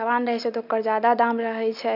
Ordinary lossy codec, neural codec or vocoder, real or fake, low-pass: none; none; real; 5.4 kHz